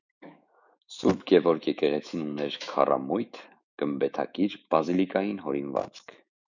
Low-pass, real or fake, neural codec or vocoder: 7.2 kHz; fake; autoencoder, 48 kHz, 128 numbers a frame, DAC-VAE, trained on Japanese speech